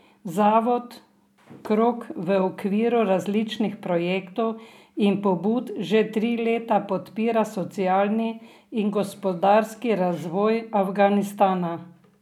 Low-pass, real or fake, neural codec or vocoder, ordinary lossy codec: 19.8 kHz; real; none; none